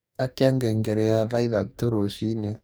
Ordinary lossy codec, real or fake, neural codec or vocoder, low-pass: none; fake; codec, 44.1 kHz, 2.6 kbps, DAC; none